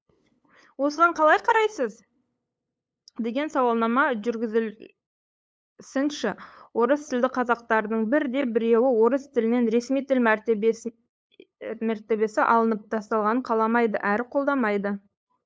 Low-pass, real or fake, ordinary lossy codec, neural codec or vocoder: none; fake; none; codec, 16 kHz, 8 kbps, FunCodec, trained on LibriTTS, 25 frames a second